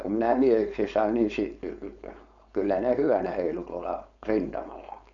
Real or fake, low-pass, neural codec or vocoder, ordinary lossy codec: fake; 7.2 kHz; codec, 16 kHz, 4.8 kbps, FACodec; none